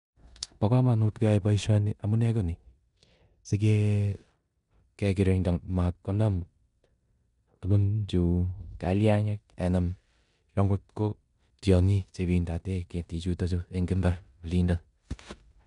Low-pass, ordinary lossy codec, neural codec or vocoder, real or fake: 10.8 kHz; none; codec, 16 kHz in and 24 kHz out, 0.9 kbps, LongCat-Audio-Codec, four codebook decoder; fake